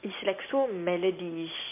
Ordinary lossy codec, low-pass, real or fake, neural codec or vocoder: MP3, 24 kbps; 3.6 kHz; real; none